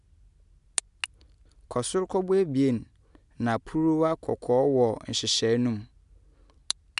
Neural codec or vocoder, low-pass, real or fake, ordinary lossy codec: none; 10.8 kHz; real; none